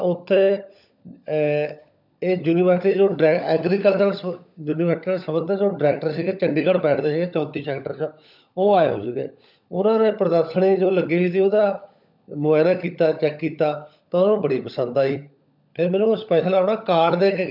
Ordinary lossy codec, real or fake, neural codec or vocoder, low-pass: none; fake; codec, 16 kHz, 16 kbps, FunCodec, trained on LibriTTS, 50 frames a second; 5.4 kHz